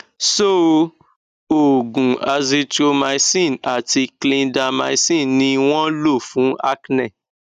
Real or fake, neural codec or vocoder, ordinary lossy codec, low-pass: real; none; none; 14.4 kHz